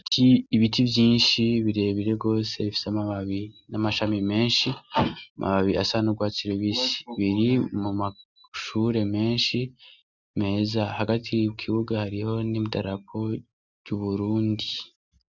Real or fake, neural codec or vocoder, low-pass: real; none; 7.2 kHz